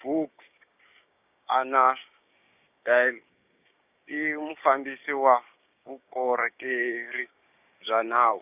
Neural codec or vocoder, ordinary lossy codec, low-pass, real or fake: codec, 16 kHz, 6 kbps, DAC; none; 3.6 kHz; fake